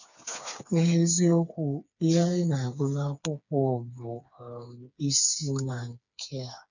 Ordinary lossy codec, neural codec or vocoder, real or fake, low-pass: none; codec, 16 kHz, 4 kbps, FreqCodec, smaller model; fake; 7.2 kHz